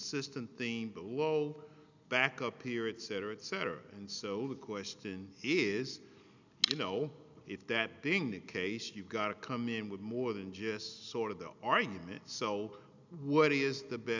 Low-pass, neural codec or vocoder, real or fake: 7.2 kHz; none; real